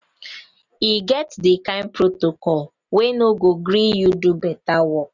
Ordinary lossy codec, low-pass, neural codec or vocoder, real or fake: none; 7.2 kHz; none; real